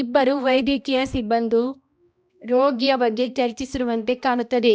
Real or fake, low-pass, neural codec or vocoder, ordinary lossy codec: fake; none; codec, 16 kHz, 1 kbps, X-Codec, HuBERT features, trained on balanced general audio; none